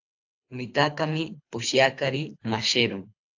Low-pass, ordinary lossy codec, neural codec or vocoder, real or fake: 7.2 kHz; AAC, 48 kbps; codec, 24 kHz, 3 kbps, HILCodec; fake